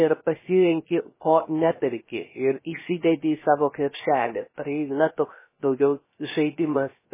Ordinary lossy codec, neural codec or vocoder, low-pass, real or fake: MP3, 16 kbps; codec, 16 kHz, 0.3 kbps, FocalCodec; 3.6 kHz; fake